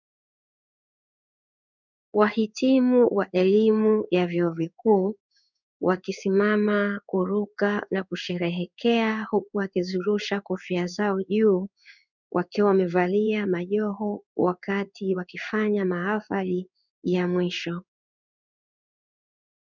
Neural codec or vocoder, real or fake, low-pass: codec, 16 kHz in and 24 kHz out, 1 kbps, XY-Tokenizer; fake; 7.2 kHz